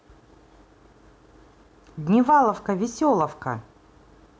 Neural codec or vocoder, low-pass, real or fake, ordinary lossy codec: none; none; real; none